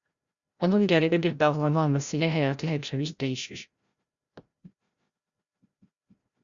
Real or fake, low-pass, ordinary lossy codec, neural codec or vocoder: fake; 7.2 kHz; Opus, 64 kbps; codec, 16 kHz, 0.5 kbps, FreqCodec, larger model